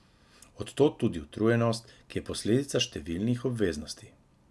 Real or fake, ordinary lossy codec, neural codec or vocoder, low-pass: real; none; none; none